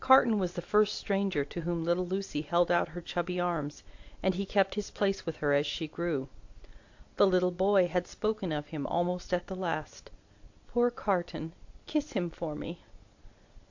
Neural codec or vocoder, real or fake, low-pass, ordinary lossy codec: none; real; 7.2 kHz; AAC, 48 kbps